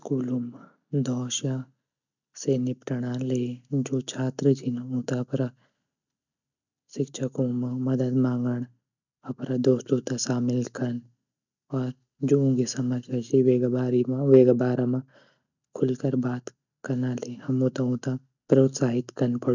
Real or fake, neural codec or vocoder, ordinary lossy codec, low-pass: real; none; none; 7.2 kHz